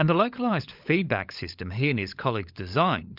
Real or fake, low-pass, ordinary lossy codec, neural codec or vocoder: fake; 5.4 kHz; Opus, 64 kbps; codec, 16 kHz, 16 kbps, FunCodec, trained on LibriTTS, 50 frames a second